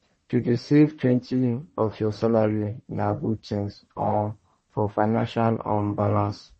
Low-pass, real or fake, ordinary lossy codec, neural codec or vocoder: 10.8 kHz; fake; MP3, 32 kbps; codec, 44.1 kHz, 1.7 kbps, Pupu-Codec